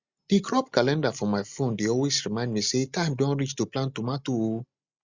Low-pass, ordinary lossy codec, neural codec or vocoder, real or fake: 7.2 kHz; Opus, 64 kbps; none; real